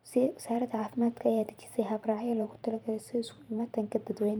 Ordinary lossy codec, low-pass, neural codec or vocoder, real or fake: none; none; vocoder, 44.1 kHz, 128 mel bands every 512 samples, BigVGAN v2; fake